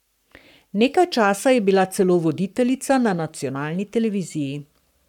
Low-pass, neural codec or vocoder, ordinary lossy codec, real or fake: 19.8 kHz; codec, 44.1 kHz, 7.8 kbps, Pupu-Codec; none; fake